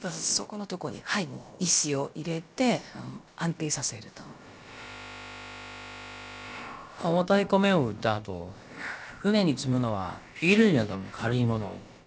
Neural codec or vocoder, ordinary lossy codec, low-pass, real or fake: codec, 16 kHz, about 1 kbps, DyCAST, with the encoder's durations; none; none; fake